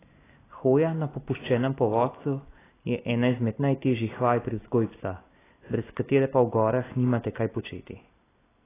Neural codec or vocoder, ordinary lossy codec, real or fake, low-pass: none; AAC, 16 kbps; real; 3.6 kHz